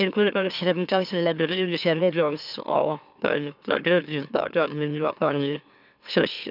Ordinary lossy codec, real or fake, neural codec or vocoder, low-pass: none; fake; autoencoder, 44.1 kHz, a latent of 192 numbers a frame, MeloTTS; 5.4 kHz